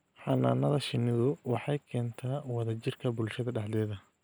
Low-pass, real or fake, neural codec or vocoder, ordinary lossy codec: none; real; none; none